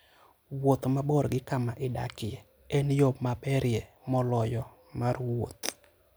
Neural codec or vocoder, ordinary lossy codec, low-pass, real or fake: none; none; none; real